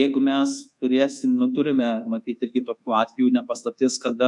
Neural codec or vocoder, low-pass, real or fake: codec, 24 kHz, 1.2 kbps, DualCodec; 10.8 kHz; fake